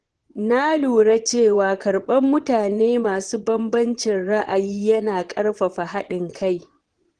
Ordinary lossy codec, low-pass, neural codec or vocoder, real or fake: Opus, 16 kbps; 9.9 kHz; vocoder, 22.05 kHz, 80 mel bands, Vocos; fake